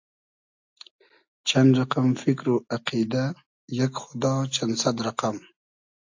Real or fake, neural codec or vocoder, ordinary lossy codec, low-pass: real; none; AAC, 48 kbps; 7.2 kHz